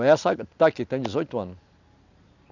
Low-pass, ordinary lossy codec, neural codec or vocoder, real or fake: 7.2 kHz; none; none; real